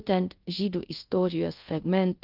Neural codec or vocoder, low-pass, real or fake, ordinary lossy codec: codec, 24 kHz, 0.5 kbps, DualCodec; 5.4 kHz; fake; Opus, 32 kbps